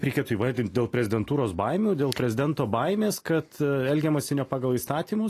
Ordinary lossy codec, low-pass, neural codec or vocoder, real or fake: AAC, 48 kbps; 14.4 kHz; none; real